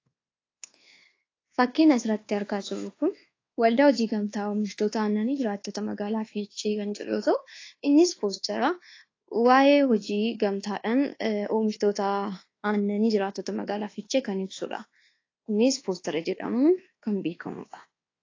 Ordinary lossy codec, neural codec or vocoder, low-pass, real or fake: AAC, 32 kbps; codec, 24 kHz, 1.2 kbps, DualCodec; 7.2 kHz; fake